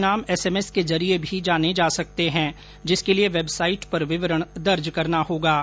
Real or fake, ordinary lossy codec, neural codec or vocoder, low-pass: real; none; none; none